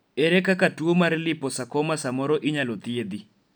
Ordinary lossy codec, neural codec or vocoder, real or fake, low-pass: none; none; real; none